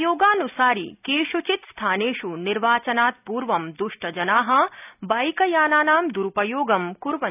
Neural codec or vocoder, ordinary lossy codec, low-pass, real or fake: none; none; 3.6 kHz; real